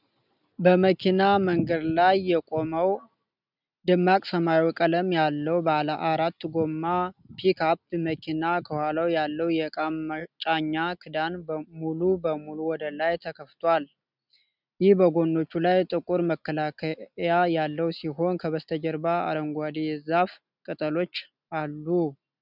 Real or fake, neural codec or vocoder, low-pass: real; none; 5.4 kHz